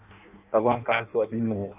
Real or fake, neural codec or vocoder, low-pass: fake; codec, 16 kHz in and 24 kHz out, 0.6 kbps, FireRedTTS-2 codec; 3.6 kHz